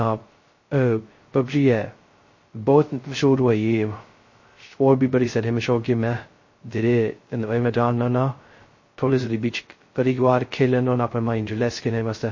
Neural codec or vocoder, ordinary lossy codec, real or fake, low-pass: codec, 16 kHz, 0.2 kbps, FocalCodec; MP3, 32 kbps; fake; 7.2 kHz